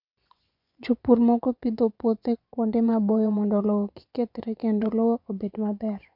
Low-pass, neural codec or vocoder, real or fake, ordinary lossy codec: 5.4 kHz; vocoder, 24 kHz, 100 mel bands, Vocos; fake; none